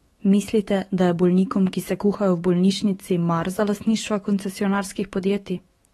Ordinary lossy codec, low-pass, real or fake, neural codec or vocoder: AAC, 32 kbps; 19.8 kHz; fake; autoencoder, 48 kHz, 128 numbers a frame, DAC-VAE, trained on Japanese speech